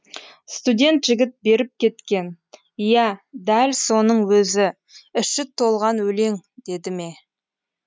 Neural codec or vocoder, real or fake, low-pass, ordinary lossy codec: none; real; none; none